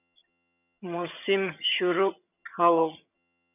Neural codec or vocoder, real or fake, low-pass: vocoder, 22.05 kHz, 80 mel bands, HiFi-GAN; fake; 3.6 kHz